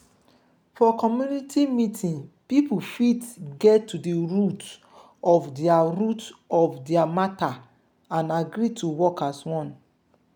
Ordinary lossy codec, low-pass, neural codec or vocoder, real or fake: none; none; none; real